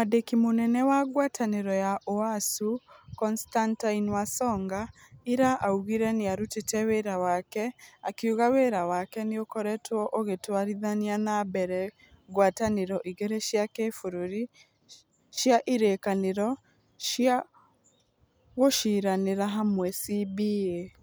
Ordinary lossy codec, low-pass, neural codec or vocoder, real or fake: none; none; none; real